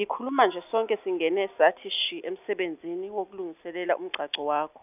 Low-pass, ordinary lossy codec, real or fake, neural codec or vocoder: 3.6 kHz; none; real; none